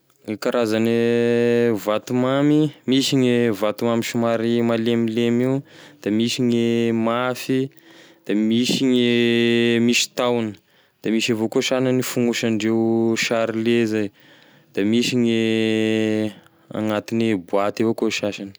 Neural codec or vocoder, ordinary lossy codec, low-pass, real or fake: none; none; none; real